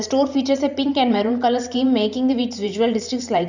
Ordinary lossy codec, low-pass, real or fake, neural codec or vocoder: none; 7.2 kHz; fake; vocoder, 44.1 kHz, 128 mel bands every 512 samples, BigVGAN v2